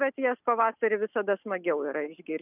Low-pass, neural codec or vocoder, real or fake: 3.6 kHz; none; real